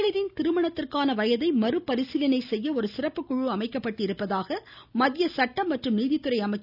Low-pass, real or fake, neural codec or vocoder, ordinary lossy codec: 5.4 kHz; real; none; none